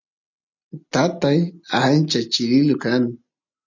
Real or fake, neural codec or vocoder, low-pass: real; none; 7.2 kHz